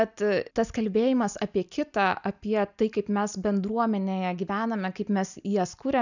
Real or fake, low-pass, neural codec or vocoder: real; 7.2 kHz; none